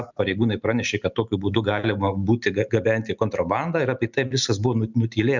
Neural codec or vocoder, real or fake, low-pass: none; real; 7.2 kHz